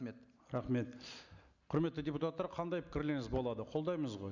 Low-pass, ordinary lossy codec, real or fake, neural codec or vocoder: 7.2 kHz; none; real; none